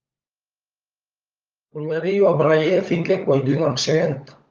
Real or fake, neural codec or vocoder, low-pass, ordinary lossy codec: fake; codec, 16 kHz, 16 kbps, FunCodec, trained on LibriTTS, 50 frames a second; 7.2 kHz; Opus, 32 kbps